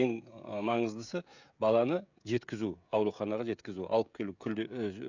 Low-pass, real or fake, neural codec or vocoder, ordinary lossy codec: 7.2 kHz; fake; codec, 16 kHz, 16 kbps, FreqCodec, smaller model; none